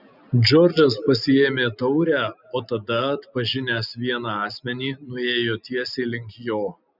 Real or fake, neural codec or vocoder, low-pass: real; none; 5.4 kHz